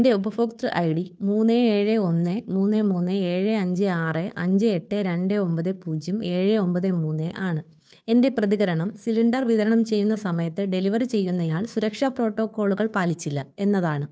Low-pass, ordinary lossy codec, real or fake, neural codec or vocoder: none; none; fake; codec, 16 kHz, 2 kbps, FunCodec, trained on Chinese and English, 25 frames a second